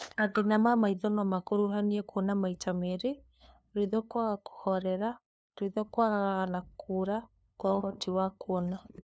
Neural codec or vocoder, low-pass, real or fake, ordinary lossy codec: codec, 16 kHz, 2 kbps, FunCodec, trained on LibriTTS, 25 frames a second; none; fake; none